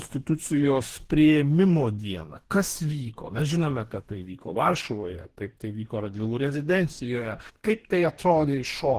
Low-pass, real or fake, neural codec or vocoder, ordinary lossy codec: 14.4 kHz; fake; codec, 44.1 kHz, 2.6 kbps, DAC; Opus, 16 kbps